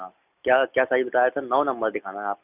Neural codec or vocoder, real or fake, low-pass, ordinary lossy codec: none; real; 3.6 kHz; none